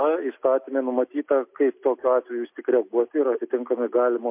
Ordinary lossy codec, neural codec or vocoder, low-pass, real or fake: MP3, 32 kbps; none; 3.6 kHz; real